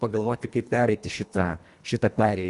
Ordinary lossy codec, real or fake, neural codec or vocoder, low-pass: Opus, 64 kbps; fake; codec, 24 kHz, 1.5 kbps, HILCodec; 10.8 kHz